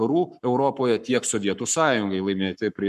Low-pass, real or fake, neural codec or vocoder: 14.4 kHz; fake; codec, 44.1 kHz, 7.8 kbps, Pupu-Codec